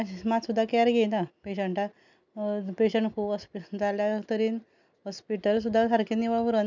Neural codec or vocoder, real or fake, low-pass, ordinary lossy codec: none; real; 7.2 kHz; none